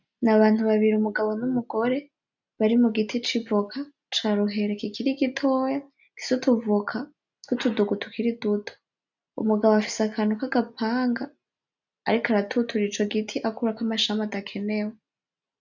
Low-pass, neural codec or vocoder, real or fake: 7.2 kHz; none; real